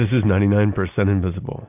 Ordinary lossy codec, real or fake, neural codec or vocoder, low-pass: AAC, 32 kbps; real; none; 3.6 kHz